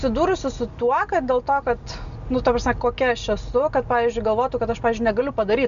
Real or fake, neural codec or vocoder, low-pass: real; none; 7.2 kHz